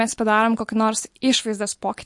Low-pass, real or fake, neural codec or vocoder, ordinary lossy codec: 10.8 kHz; real; none; MP3, 48 kbps